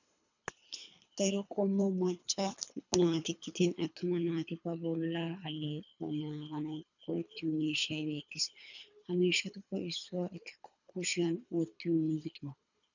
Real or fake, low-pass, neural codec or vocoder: fake; 7.2 kHz; codec, 24 kHz, 3 kbps, HILCodec